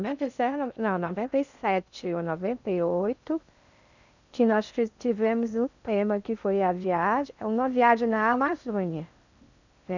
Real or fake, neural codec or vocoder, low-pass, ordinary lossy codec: fake; codec, 16 kHz in and 24 kHz out, 0.6 kbps, FocalCodec, streaming, 2048 codes; 7.2 kHz; none